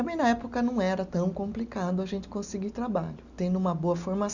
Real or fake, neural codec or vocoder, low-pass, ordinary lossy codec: real; none; 7.2 kHz; none